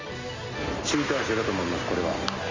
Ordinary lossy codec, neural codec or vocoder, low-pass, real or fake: Opus, 32 kbps; none; 7.2 kHz; real